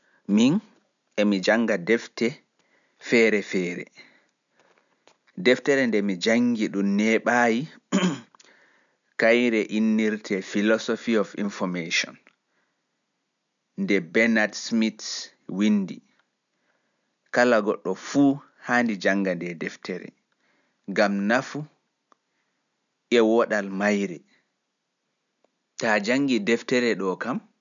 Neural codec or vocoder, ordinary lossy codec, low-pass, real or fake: none; none; 7.2 kHz; real